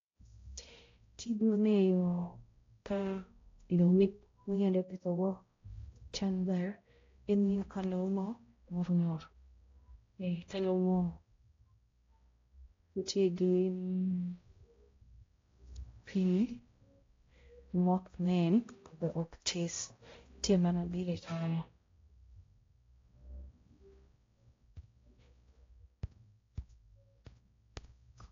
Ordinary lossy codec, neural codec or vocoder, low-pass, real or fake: AAC, 32 kbps; codec, 16 kHz, 0.5 kbps, X-Codec, HuBERT features, trained on balanced general audio; 7.2 kHz; fake